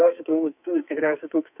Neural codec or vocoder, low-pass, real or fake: codec, 44.1 kHz, 2.6 kbps, SNAC; 3.6 kHz; fake